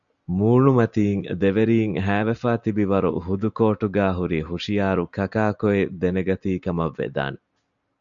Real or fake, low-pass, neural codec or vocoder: real; 7.2 kHz; none